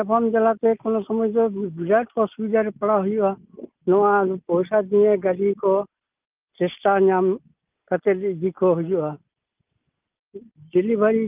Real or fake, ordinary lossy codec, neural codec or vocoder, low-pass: real; Opus, 24 kbps; none; 3.6 kHz